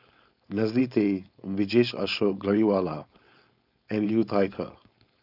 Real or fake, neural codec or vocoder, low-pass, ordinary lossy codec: fake; codec, 16 kHz, 4.8 kbps, FACodec; 5.4 kHz; none